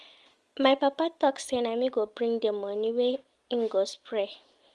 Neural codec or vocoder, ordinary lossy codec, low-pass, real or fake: none; Opus, 24 kbps; 10.8 kHz; real